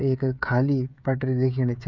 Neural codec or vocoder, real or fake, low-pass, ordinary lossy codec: codec, 16 kHz, 16 kbps, FreqCodec, smaller model; fake; 7.2 kHz; none